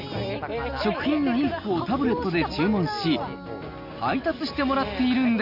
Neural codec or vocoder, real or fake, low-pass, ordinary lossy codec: none; real; 5.4 kHz; none